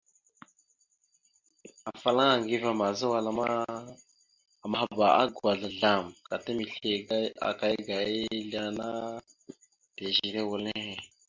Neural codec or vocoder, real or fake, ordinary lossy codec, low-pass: none; real; MP3, 64 kbps; 7.2 kHz